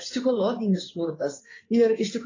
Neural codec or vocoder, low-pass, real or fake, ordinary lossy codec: codec, 16 kHz in and 24 kHz out, 2.2 kbps, FireRedTTS-2 codec; 7.2 kHz; fake; AAC, 32 kbps